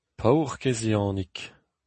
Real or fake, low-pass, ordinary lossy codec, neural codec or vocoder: real; 10.8 kHz; MP3, 32 kbps; none